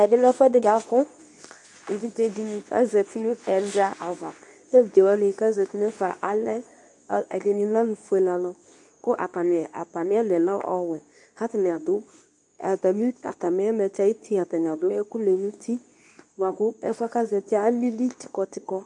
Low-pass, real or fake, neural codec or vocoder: 10.8 kHz; fake; codec, 24 kHz, 0.9 kbps, WavTokenizer, medium speech release version 2